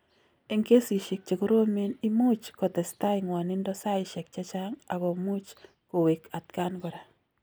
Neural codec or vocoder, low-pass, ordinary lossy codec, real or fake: none; none; none; real